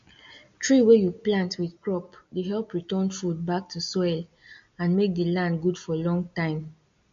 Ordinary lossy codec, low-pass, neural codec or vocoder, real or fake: MP3, 48 kbps; 7.2 kHz; none; real